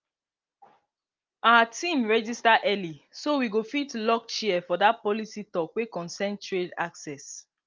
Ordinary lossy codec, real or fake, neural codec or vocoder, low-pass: Opus, 24 kbps; real; none; 7.2 kHz